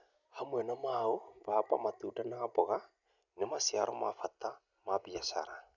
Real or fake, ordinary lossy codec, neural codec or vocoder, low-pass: real; none; none; 7.2 kHz